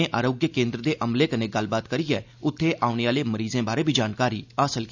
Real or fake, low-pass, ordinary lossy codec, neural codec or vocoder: real; 7.2 kHz; none; none